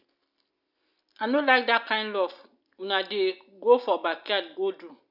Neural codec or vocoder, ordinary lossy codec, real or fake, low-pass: none; none; real; 5.4 kHz